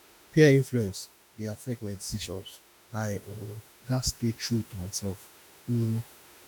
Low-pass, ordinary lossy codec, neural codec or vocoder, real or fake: none; none; autoencoder, 48 kHz, 32 numbers a frame, DAC-VAE, trained on Japanese speech; fake